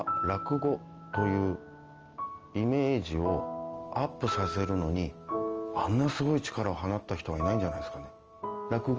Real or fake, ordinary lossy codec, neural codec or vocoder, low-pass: real; Opus, 24 kbps; none; 7.2 kHz